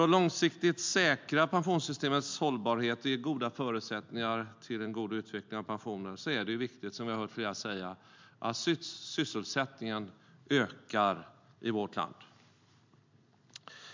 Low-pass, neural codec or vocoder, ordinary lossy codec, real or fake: 7.2 kHz; none; MP3, 64 kbps; real